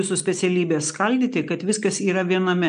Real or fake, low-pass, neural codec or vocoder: real; 9.9 kHz; none